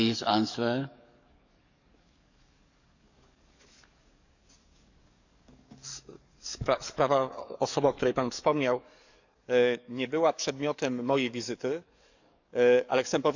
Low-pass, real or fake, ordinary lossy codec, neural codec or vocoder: 7.2 kHz; fake; none; codec, 44.1 kHz, 7.8 kbps, DAC